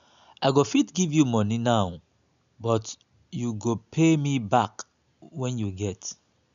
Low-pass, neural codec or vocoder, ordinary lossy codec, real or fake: 7.2 kHz; none; none; real